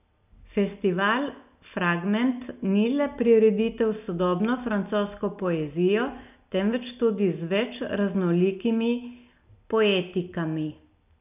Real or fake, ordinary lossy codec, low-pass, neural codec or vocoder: real; none; 3.6 kHz; none